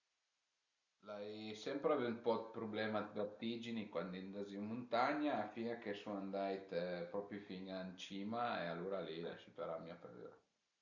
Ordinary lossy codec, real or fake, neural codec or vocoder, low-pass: Opus, 64 kbps; real; none; 7.2 kHz